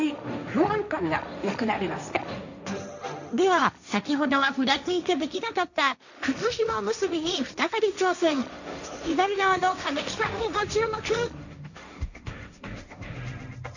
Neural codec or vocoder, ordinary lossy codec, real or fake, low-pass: codec, 16 kHz, 1.1 kbps, Voila-Tokenizer; none; fake; 7.2 kHz